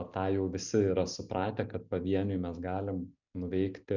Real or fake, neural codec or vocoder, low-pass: real; none; 7.2 kHz